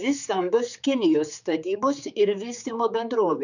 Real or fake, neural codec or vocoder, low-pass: fake; codec, 16 kHz, 4 kbps, X-Codec, HuBERT features, trained on general audio; 7.2 kHz